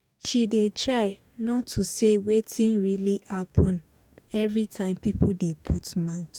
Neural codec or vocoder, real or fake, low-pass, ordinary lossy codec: codec, 44.1 kHz, 2.6 kbps, DAC; fake; 19.8 kHz; none